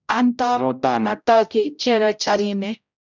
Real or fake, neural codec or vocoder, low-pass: fake; codec, 16 kHz, 0.5 kbps, X-Codec, HuBERT features, trained on general audio; 7.2 kHz